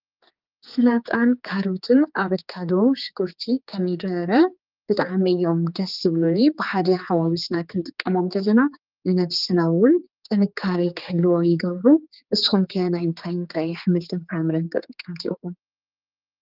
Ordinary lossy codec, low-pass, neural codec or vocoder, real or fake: Opus, 24 kbps; 5.4 kHz; codec, 16 kHz, 4 kbps, X-Codec, HuBERT features, trained on general audio; fake